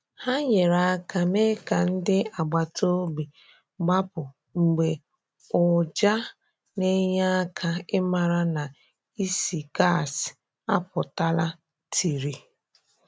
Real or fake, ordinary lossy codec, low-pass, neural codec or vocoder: real; none; none; none